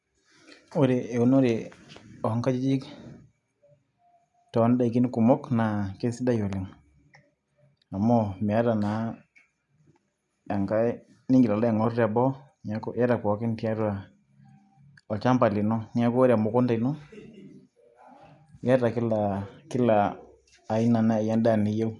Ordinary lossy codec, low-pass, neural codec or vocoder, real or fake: none; 10.8 kHz; none; real